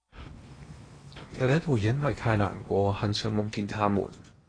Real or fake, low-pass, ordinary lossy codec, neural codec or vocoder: fake; 9.9 kHz; AAC, 32 kbps; codec, 16 kHz in and 24 kHz out, 0.8 kbps, FocalCodec, streaming, 65536 codes